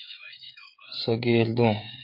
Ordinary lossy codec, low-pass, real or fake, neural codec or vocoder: MP3, 32 kbps; 5.4 kHz; fake; codec, 16 kHz, 16 kbps, FreqCodec, smaller model